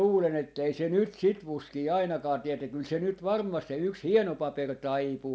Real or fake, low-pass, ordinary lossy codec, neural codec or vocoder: real; none; none; none